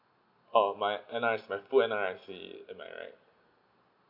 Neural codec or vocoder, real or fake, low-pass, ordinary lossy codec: none; real; 5.4 kHz; none